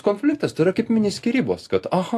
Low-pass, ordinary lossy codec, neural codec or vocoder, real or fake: 14.4 kHz; AAC, 64 kbps; none; real